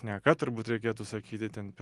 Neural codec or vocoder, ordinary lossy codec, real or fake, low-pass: none; Opus, 24 kbps; real; 10.8 kHz